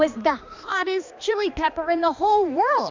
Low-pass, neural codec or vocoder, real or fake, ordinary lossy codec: 7.2 kHz; codec, 16 kHz, 2 kbps, X-Codec, HuBERT features, trained on balanced general audio; fake; MP3, 64 kbps